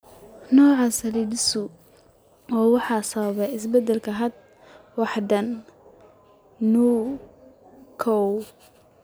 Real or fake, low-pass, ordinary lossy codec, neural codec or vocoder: real; none; none; none